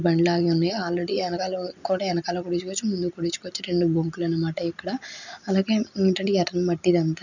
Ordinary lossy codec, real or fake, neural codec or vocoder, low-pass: none; real; none; 7.2 kHz